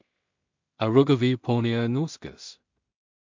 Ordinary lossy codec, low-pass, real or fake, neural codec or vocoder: MP3, 64 kbps; 7.2 kHz; fake; codec, 16 kHz in and 24 kHz out, 0.4 kbps, LongCat-Audio-Codec, two codebook decoder